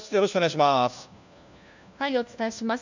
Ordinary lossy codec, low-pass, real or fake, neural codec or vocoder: none; 7.2 kHz; fake; codec, 16 kHz, 1 kbps, FunCodec, trained on LibriTTS, 50 frames a second